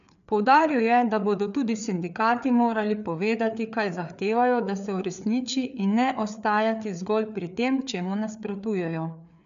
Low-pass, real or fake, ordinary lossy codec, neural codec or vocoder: 7.2 kHz; fake; none; codec, 16 kHz, 4 kbps, FreqCodec, larger model